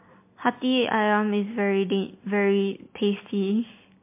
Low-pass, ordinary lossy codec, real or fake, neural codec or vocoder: 3.6 kHz; MP3, 24 kbps; real; none